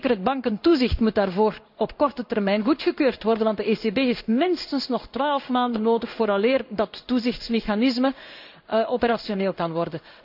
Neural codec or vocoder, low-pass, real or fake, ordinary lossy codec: codec, 16 kHz in and 24 kHz out, 1 kbps, XY-Tokenizer; 5.4 kHz; fake; none